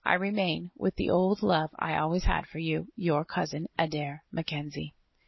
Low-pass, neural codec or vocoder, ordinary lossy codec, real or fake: 7.2 kHz; none; MP3, 24 kbps; real